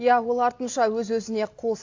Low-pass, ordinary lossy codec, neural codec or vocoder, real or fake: 7.2 kHz; AAC, 48 kbps; none; real